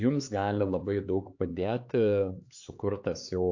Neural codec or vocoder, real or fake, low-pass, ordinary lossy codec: codec, 16 kHz, 4 kbps, X-Codec, HuBERT features, trained on LibriSpeech; fake; 7.2 kHz; AAC, 48 kbps